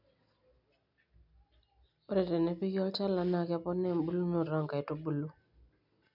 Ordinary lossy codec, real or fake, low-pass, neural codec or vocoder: none; real; 5.4 kHz; none